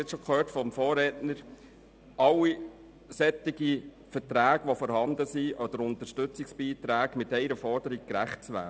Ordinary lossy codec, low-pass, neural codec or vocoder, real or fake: none; none; none; real